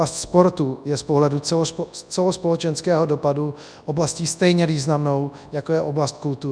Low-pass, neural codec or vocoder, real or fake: 9.9 kHz; codec, 24 kHz, 0.9 kbps, WavTokenizer, large speech release; fake